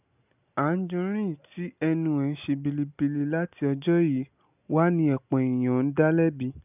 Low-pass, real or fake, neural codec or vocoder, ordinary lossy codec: 3.6 kHz; real; none; none